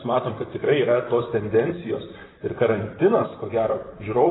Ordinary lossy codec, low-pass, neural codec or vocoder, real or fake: AAC, 16 kbps; 7.2 kHz; vocoder, 44.1 kHz, 128 mel bands, Pupu-Vocoder; fake